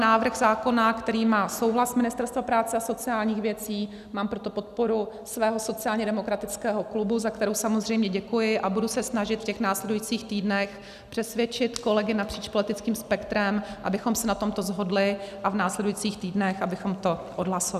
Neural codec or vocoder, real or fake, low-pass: none; real; 14.4 kHz